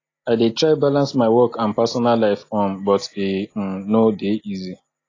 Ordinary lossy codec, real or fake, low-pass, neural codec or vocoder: AAC, 32 kbps; real; 7.2 kHz; none